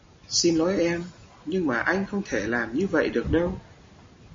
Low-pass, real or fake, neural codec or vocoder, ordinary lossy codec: 7.2 kHz; real; none; MP3, 32 kbps